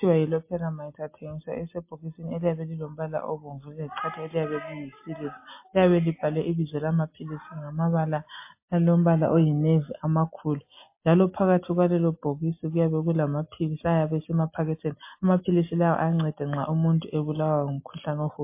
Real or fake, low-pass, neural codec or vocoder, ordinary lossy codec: real; 3.6 kHz; none; MP3, 24 kbps